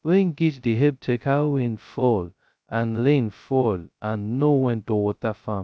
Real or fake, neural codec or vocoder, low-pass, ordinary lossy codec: fake; codec, 16 kHz, 0.2 kbps, FocalCodec; none; none